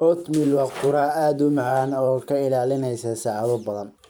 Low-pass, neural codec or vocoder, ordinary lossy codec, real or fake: none; vocoder, 44.1 kHz, 128 mel bands every 512 samples, BigVGAN v2; none; fake